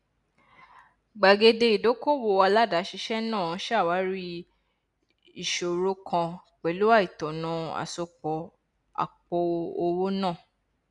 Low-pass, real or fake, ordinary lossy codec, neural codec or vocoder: 10.8 kHz; real; none; none